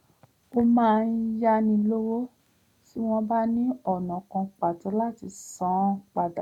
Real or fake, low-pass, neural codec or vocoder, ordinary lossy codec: real; 19.8 kHz; none; none